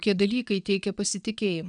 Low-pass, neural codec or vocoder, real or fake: 9.9 kHz; vocoder, 22.05 kHz, 80 mel bands, WaveNeXt; fake